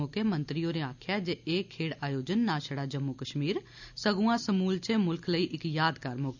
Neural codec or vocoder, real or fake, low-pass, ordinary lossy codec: none; real; 7.2 kHz; none